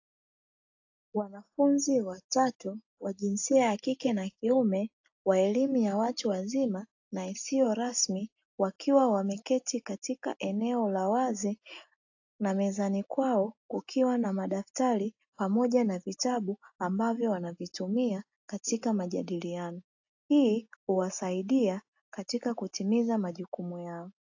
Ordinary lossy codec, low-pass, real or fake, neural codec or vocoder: AAC, 48 kbps; 7.2 kHz; real; none